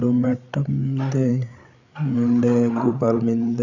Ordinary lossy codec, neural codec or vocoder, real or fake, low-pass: none; codec, 16 kHz, 8 kbps, FreqCodec, larger model; fake; 7.2 kHz